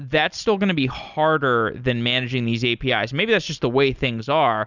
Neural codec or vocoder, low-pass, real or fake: none; 7.2 kHz; real